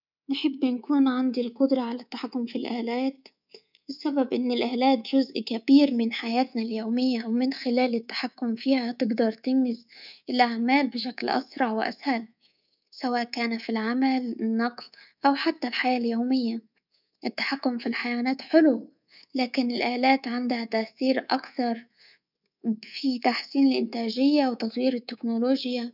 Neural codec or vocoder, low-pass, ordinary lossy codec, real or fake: codec, 24 kHz, 3.1 kbps, DualCodec; 5.4 kHz; none; fake